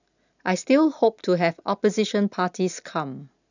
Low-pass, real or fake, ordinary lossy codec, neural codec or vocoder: 7.2 kHz; real; none; none